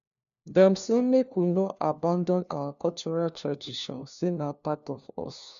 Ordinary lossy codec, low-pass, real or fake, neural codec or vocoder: AAC, 96 kbps; 7.2 kHz; fake; codec, 16 kHz, 1 kbps, FunCodec, trained on LibriTTS, 50 frames a second